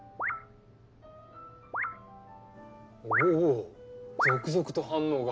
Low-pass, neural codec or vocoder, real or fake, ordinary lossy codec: none; none; real; none